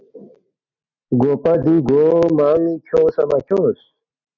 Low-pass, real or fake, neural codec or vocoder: 7.2 kHz; real; none